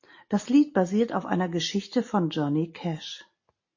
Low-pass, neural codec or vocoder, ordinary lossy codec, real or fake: 7.2 kHz; none; MP3, 32 kbps; real